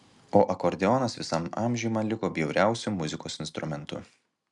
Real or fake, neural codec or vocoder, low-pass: real; none; 10.8 kHz